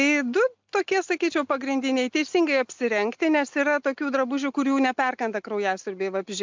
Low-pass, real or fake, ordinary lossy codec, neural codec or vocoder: 7.2 kHz; real; MP3, 64 kbps; none